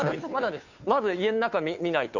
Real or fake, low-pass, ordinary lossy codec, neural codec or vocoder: fake; 7.2 kHz; none; codec, 16 kHz, 2 kbps, FunCodec, trained on Chinese and English, 25 frames a second